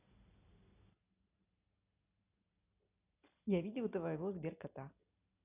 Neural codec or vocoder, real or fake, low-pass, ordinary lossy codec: none; real; 3.6 kHz; none